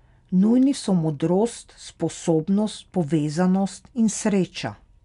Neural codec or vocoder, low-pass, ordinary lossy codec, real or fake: none; 10.8 kHz; none; real